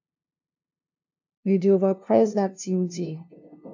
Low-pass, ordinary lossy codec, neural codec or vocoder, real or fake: 7.2 kHz; AAC, 48 kbps; codec, 16 kHz, 0.5 kbps, FunCodec, trained on LibriTTS, 25 frames a second; fake